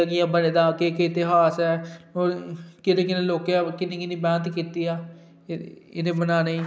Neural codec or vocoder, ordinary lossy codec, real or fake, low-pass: none; none; real; none